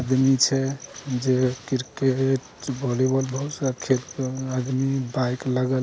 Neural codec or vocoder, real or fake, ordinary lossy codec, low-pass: none; real; none; none